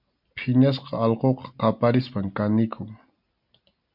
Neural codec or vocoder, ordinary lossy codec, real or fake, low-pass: none; MP3, 48 kbps; real; 5.4 kHz